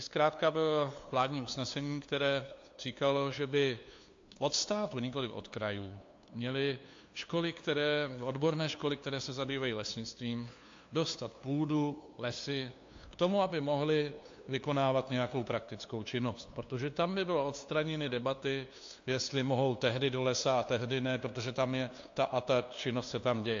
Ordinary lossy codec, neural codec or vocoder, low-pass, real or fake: AAC, 48 kbps; codec, 16 kHz, 2 kbps, FunCodec, trained on LibriTTS, 25 frames a second; 7.2 kHz; fake